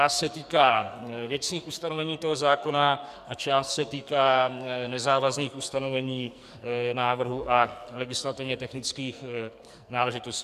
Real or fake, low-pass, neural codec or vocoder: fake; 14.4 kHz; codec, 44.1 kHz, 2.6 kbps, SNAC